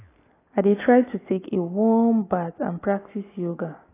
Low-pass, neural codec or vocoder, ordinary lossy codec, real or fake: 3.6 kHz; none; AAC, 16 kbps; real